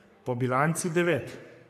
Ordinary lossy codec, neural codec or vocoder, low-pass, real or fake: AAC, 96 kbps; codec, 44.1 kHz, 3.4 kbps, Pupu-Codec; 14.4 kHz; fake